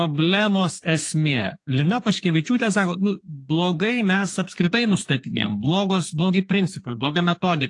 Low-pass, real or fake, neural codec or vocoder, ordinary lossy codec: 10.8 kHz; fake; codec, 32 kHz, 1.9 kbps, SNAC; AAC, 48 kbps